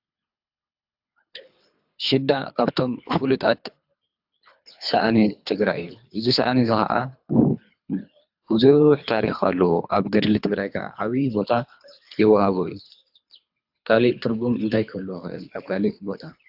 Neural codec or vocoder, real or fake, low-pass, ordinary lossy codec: codec, 24 kHz, 3 kbps, HILCodec; fake; 5.4 kHz; AAC, 48 kbps